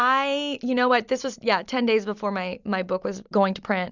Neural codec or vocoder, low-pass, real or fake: none; 7.2 kHz; real